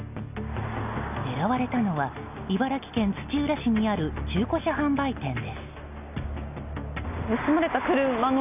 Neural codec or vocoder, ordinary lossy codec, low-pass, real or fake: none; none; 3.6 kHz; real